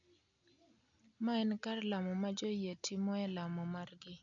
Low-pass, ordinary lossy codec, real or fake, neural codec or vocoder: 7.2 kHz; none; real; none